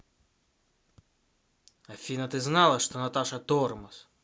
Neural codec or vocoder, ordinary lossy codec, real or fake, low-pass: none; none; real; none